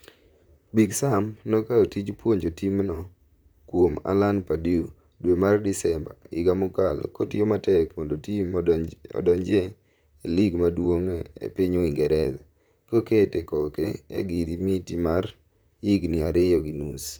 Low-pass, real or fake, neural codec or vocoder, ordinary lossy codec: none; fake; vocoder, 44.1 kHz, 128 mel bands, Pupu-Vocoder; none